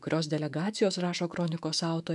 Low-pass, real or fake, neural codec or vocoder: 10.8 kHz; fake; autoencoder, 48 kHz, 128 numbers a frame, DAC-VAE, trained on Japanese speech